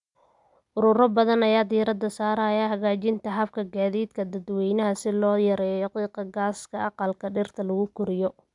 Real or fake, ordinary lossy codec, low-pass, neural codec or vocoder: real; none; 10.8 kHz; none